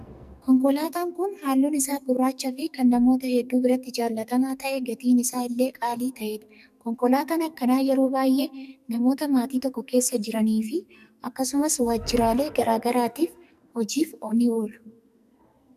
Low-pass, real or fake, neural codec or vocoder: 14.4 kHz; fake; codec, 44.1 kHz, 2.6 kbps, SNAC